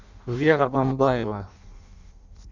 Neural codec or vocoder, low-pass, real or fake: codec, 16 kHz in and 24 kHz out, 0.6 kbps, FireRedTTS-2 codec; 7.2 kHz; fake